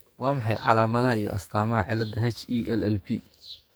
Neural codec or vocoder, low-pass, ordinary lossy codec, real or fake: codec, 44.1 kHz, 2.6 kbps, SNAC; none; none; fake